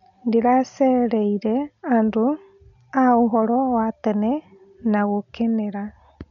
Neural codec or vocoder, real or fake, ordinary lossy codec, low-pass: none; real; none; 7.2 kHz